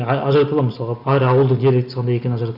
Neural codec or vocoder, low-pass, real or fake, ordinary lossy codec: none; 5.4 kHz; real; none